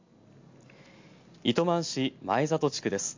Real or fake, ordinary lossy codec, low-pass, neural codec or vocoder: real; MP3, 48 kbps; 7.2 kHz; none